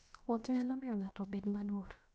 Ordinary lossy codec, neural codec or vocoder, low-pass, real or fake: none; codec, 16 kHz, about 1 kbps, DyCAST, with the encoder's durations; none; fake